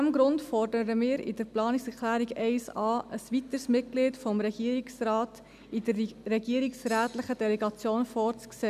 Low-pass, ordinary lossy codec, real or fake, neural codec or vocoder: 14.4 kHz; none; real; none